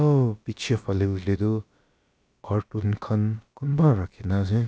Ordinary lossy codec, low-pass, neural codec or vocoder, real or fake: none; none; codec, 16 kHz, about 1 kbps, DyCAST, with the encoder's durations; fake